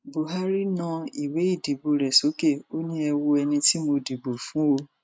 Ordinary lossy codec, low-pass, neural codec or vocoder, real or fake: none; none; none; real